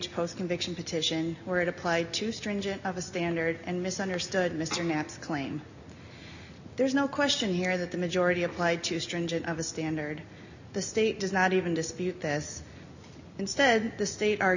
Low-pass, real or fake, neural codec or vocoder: 7.2 kHz; real; none